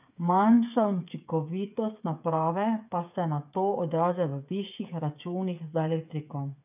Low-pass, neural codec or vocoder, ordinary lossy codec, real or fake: 3.6 kHz; codec, 16 kHz, 8 kbps, FreqCodec, smaller model; none; fake